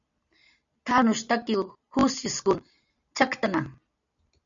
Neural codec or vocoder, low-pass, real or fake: none; 7.2 kHz; real